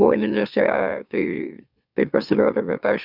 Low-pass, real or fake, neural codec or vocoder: 5.4 kHz; fake; autoencoder, 44.1 kHz, a latent of 192 numbers a frame, MeloTTS